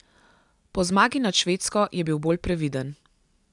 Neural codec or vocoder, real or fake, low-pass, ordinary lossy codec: none; real; 10.8 kHz; none